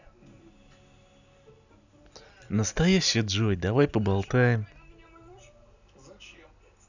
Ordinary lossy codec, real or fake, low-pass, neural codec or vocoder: none; real; 7.2 kHz; none